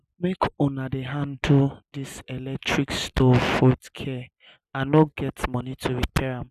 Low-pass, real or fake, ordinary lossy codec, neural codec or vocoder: 14.4 kHz; real; none; none